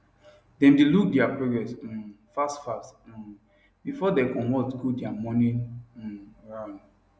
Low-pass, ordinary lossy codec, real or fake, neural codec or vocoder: none; none; real; none